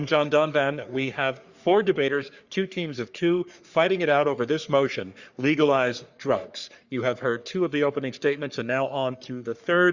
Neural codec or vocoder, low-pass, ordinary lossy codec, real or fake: codec, 44.1 kHz, 3.4 kbps, Pupu-Codec; 7.2 kHz; Opus, 64 kbps; fake